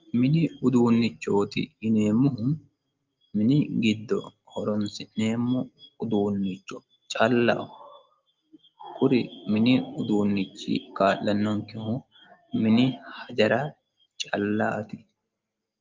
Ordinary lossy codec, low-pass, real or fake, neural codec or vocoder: Opus, 32 kbps; 7.2 kHz; real; none